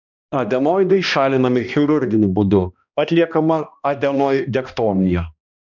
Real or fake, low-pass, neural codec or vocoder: fake; 7.2 kHz; codec, 16 kHz, 1 kbps, X-Codec, HuBERT features, trained on balanced general audio